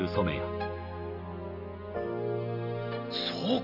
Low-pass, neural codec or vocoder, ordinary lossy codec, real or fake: 5.4 kHz; none; none; real